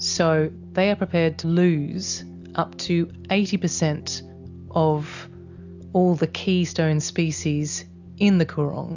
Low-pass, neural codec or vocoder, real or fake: 7.2 kHz; none; real